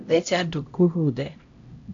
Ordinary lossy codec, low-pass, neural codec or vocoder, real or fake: AAC, 48 kbps; 7.2 kHz; codec, 16 kHz, 0.5 kbps, X-Codec, HuBERT features, trained on LibriSpeech; fake